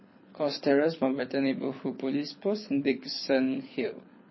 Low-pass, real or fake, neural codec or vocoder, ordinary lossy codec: 7.2 kHz; fake; codec, 24 kHz, 6 kbps, HILCodec; MP3, 24 kbps